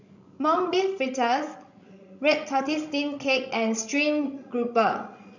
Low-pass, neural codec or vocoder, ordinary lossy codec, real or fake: 7.2 kHz; codec, 16 kHz, 16 kbps, FreqCodec, larger model; none; fake